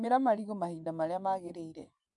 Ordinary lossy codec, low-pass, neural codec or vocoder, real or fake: AAC, 64 kbps; 10.8 kHz; vocoder, 24 kHz, 100 mel bands, Vocos; fake